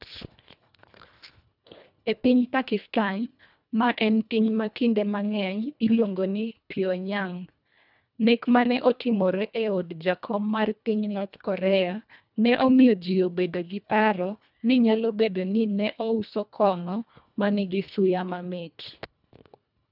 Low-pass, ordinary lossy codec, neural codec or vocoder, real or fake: 5.4 kHz; none; codec, 24 kHz, 1.5 kbps, HILCodec; fake